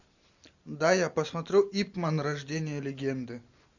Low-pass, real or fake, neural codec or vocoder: 7.2 kHz; real; none